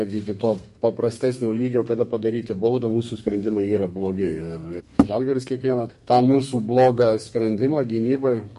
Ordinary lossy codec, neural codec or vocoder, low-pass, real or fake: MP3, 48 kbps; codec, 32 kHz, 1.9 kbps, SNAC; 14.4 kHz; fake